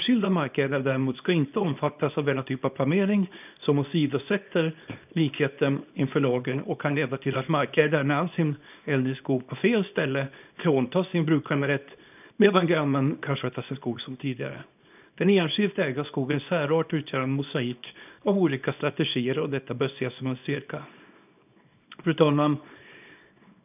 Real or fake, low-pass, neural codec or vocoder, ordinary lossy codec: fake; 3.6 kHz; codec, 24 kHz, 0.9 kbps, WavTokenizer, small release; none